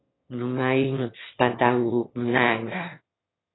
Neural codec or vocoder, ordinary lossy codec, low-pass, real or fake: autoencoder, 22.05 kHz, a latent of 192 numbers a frame, VITS, trained on one speaker; AAC, 16 kbps; 7.2 kHz; fake